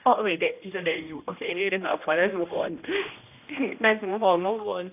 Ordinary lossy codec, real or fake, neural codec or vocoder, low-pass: AAC, 32 kbps; fake; codec, 16 kHz, 1 kbps, X-Codec, HuBERT features, trained on general audio; 3.6 kHz